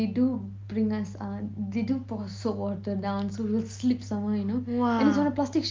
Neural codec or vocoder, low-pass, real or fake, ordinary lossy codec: none; 7.2 kHz; real; Opus, 32 kbps